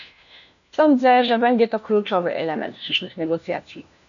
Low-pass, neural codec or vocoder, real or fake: 7.2 kHz; codec, 16 kHz, 1 kbps, FunCodec, trained on LibriTTS, 50 frames a second; fake